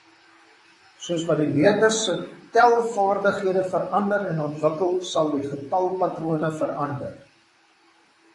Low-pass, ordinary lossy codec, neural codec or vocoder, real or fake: 10.8 kHz; AAC, 48 kbps; vocoder, 44.1 kHz, 128 mel bands, Pupu-Vocoder; fake